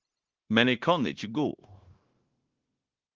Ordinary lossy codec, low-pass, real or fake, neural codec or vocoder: Opus, 16 kbps; 7.2 kHz; fake; codec, 16 kHz, 0.9 kbps, LongCat-Audio-Codec